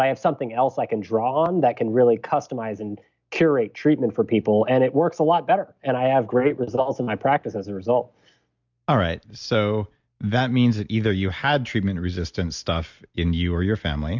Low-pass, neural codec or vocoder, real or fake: 7.2 kHz; none; real